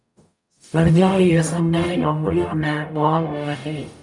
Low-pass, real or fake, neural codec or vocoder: 10.8 kHz; fake; codec, 44.1 kHz, 0.9 kbps, DAC